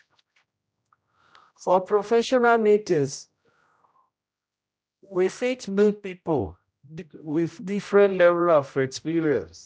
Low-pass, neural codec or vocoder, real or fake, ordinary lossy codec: none; codec, 16 kHz, 0.5 kbps, X-Codec, HuBERT features, trained on general audio; fake; none